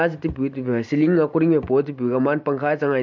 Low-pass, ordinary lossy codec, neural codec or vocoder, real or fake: 7.2 kHz; MP3, 48 kbps; none; real